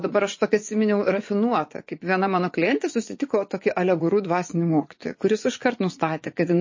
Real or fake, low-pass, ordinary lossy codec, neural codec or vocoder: fake; 7.2 kHz; MP3, 32 kbps; vocoder, 24 kHz, 100 mel bands, Vocos